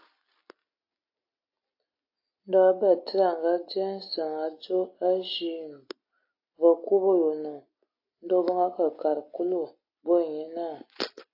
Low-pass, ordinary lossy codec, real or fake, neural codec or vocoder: 5.4 kHz; AAC, 32 kbps; real; none